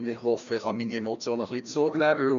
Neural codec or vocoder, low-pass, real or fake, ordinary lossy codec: codec, 16 kHz, 1 kbps, FreqCodec, larger model; 7.2 kHz; fake; none